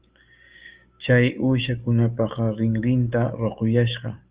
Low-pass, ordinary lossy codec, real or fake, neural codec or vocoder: 3.6 kHz; Opus, 24 kbps; fake; codec, 16 kHz, 6 kbps, DAC